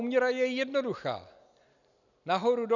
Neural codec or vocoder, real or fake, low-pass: none; real; 7.2 kHz